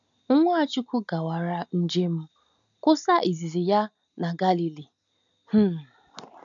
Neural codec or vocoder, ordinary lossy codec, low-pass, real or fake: none; none; 7.2 kHz; real